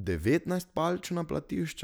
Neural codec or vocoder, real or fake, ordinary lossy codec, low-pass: none; real; none; none